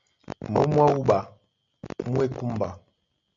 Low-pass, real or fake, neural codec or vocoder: 7.2 kHz; real; none